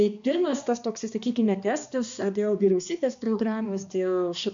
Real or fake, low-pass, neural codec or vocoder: fake; 7.2 kHz; codec, 16 kHz, 2 kbps, X-Codec, HuBERT features, trained on balanced general audio